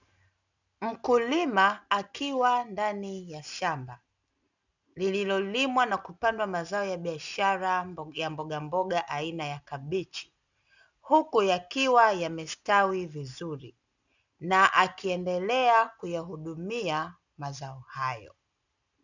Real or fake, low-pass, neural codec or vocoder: real; 7.2 kHz; none